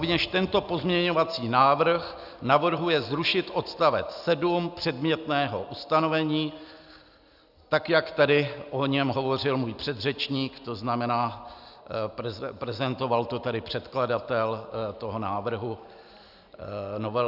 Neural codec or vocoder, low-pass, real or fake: none; 5.4 kHz; real